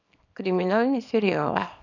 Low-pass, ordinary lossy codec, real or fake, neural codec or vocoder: 7.2 kHz; none; fake; codec, 24 kHz, 0.9 kbps, WavTokenizer, small release